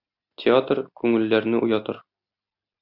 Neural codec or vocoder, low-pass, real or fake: none; 5.4 kHz; real